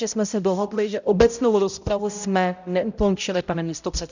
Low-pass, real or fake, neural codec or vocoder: 7.2 kHz; fake; codec, 16 kHz, 0.5 kbps, X-Codec, HuBERT features, trained on balanced general audio